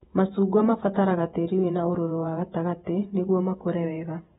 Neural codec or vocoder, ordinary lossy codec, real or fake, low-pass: vocoder, 48 kHz, 128 mel bands, Vocos; AAC, 16 kbps; fake; 19.8 kHz